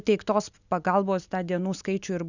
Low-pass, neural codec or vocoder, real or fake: 7.2 kHz; none; real